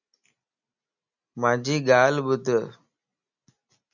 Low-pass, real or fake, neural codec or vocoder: 7.2 kHz; real; none